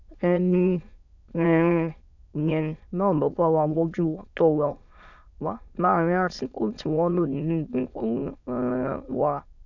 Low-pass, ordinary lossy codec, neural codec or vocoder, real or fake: 7.2 kHz; none; autoencoder, 22.05 kHz, a latent of 192 numbers a frame, VITS, trained on many speakers; fake